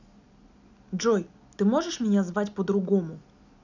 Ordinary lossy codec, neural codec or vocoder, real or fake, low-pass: none; none; real; 7.2 kHz